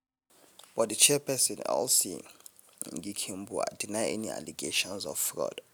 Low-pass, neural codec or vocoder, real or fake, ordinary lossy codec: none; none; real; none